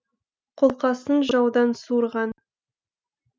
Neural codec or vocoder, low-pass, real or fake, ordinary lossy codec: none; none; real; none